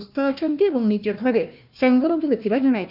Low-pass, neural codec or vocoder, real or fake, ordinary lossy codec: 5.4 kHz; codec, 16 kHz, 1 kbps, FunCodec, trained on Chinese and English, 50 frames a second; fake; none